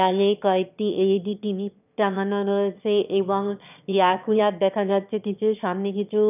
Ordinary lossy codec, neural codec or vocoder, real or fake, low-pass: none; autoencoder, 22.05 kHz, a latent of 192 numbers a frame, VITS, trained on one speaker; fake; 3.6 kHz